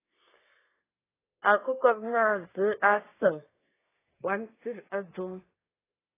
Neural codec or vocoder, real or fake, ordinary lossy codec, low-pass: codec, 24 kHz, 1 kbps, SNAC; fake; AAC, 16 kbps; 3.6 kHz